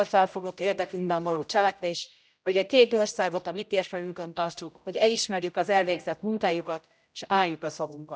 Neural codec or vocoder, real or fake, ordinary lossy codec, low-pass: codec, 16 kHz, 0.5 kbps, X-Codec, HuBERT features, trained on general audio; fake; none; none